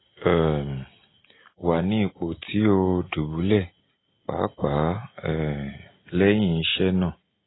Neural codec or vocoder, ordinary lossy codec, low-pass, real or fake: none; AAC, 16 kbps; 7.2 kHz; real